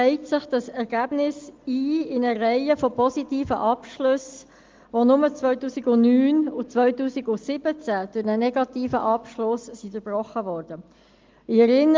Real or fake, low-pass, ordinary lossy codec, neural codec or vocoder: real; 7.2 kHz; Opus, 32 kbps; none